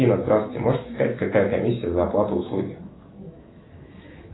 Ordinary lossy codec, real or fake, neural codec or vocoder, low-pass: AAC, 16 kbps; fake; autoencoder, 48 kHz, 128 numbers a frame, DAC-VAE, trained on Japanese speech; 7.2 kHz